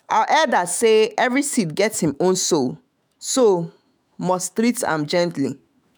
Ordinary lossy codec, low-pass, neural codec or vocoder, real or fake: none; none; autoencoder, 48 kHz, 128 numbers a frame, DAC-VAE, trained on Japanese speech; fake